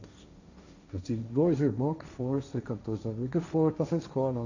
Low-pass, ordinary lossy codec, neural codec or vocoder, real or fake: 7.2 kHz; none; codec, 16 kHz, 1.1 kbps, Voila-Tokenizer; fake